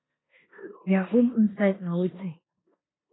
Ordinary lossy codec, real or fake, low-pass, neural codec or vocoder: AAC, 16 kbps; fake; 7.2 kHz; codec, 16 kHz in and 24 kHz out, 0.9 kbps, LongCat-Audio-Codec, four codebook decoder